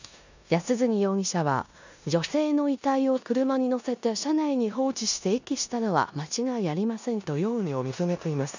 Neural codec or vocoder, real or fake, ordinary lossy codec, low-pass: codec, 16 kHz in and 24 kHz out, 0.9 kbps, LongCat-Audio-Codec, four codebook decoder; fake; none; 7.2 kHz